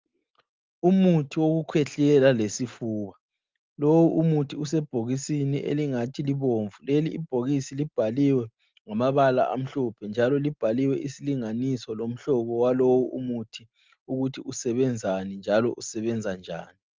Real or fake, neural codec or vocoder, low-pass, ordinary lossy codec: real; none; 7.2 kHz; Opus, 32 kbps